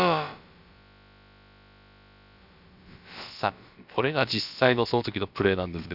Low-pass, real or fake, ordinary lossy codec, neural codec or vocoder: 5.4 kHz; fake; none; codec, 16 kHz, about 1 kbps, DyCAST, with the encoder's durations